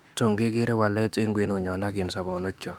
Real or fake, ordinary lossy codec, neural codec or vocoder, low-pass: fake; none; autoencoder, 48 kHz, 32 numbers a frame, DAC-VAE, trained on Japanese speech; 19.8 kHz